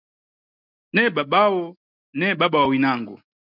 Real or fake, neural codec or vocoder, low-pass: real; none; 5.4 kHz